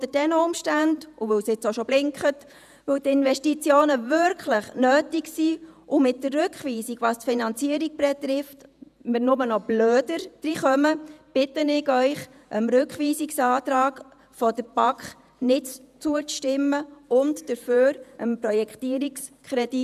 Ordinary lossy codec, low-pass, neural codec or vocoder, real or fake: none; 14.4 kHz; vocoder, 48 kHz, 128 mel bands, Vocos; fake